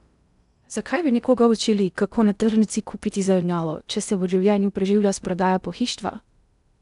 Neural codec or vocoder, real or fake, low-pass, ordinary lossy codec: codec, 16 kHz in and 24 kHz out, 0.6 kbps, FocalCodec, streaming, 2048 codes; fake; 10.8 kHz; none